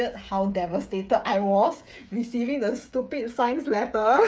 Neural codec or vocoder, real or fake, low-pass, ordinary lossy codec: codec, 16 kHz, 8 kbps, FreqCodec, smaller model; fake; none; none